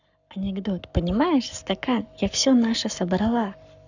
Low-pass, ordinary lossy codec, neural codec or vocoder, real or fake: 7.2 kHz; none; codec, 44.1 kHz, 7.8 kbps, Pupu-Codec; fake